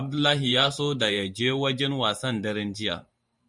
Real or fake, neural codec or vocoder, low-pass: fake; vocoder, 44.1 kHz, 128 mel bands every 512 samples, BigVGAN v2; 10.8 kHz